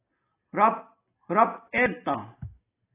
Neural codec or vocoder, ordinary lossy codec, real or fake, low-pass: none; AAC, 16 kbps; real; 3.6 kHz